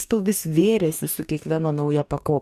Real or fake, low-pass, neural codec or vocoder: fake; 14.4 kHz; codec, 44.1 kHz, 2.6 kbps, DAC